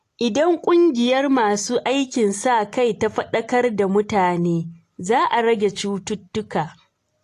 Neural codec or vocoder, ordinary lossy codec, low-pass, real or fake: none; AAC, 48 kbps; 14.4 kHz; real